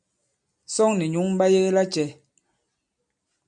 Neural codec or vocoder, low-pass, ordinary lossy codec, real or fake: none; 9.9 kHz; MP3, 64 kbps; real